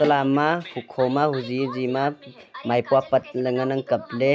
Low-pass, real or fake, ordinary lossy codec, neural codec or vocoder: none; real; none; none